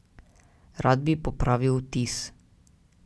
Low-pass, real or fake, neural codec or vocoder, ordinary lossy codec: none; real; none; none